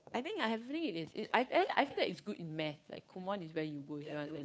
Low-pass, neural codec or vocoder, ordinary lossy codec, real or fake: none; codec, 16 kHz, 2 kbps, FunCodec, trained on Chinese and English, 25 frames a second; none; fake